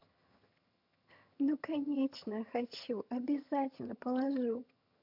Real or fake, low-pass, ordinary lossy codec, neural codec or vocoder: fake; 5.4 kHz; none; vocoder, 22.05 kHz, 80 mel bands, HiFi-GAN